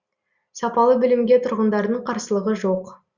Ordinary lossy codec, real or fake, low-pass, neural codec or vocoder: Opus, 64 kbps; real; 7.2 kHz; none